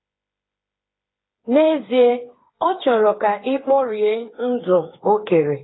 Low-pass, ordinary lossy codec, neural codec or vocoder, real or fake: 7.2 kHz; AAC, 16 kbps; codec, 16 kHz, 4 kbps, FreqCodec, smaller model; fake